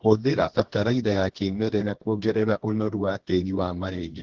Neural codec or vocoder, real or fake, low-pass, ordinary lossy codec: codec, 24 kHz, 0.9 kbps, WavTokenizer, medium music audio release; fake; 7.2 kHz; Opus, 16 kbps